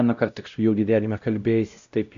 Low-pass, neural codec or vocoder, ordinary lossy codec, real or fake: 7.2 kHz; codec, 16 kHz, 0.5 kbps, X-Codec, WavLM features, trained on Multilingual LibriSpeech; AAC, 96 kbps; fake